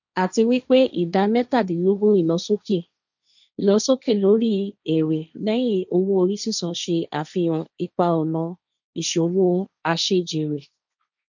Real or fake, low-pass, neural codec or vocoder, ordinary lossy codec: fake; 7.2 kHz; codec, 16 kHz, 1.1 kbps, Voila-Tokenizer; none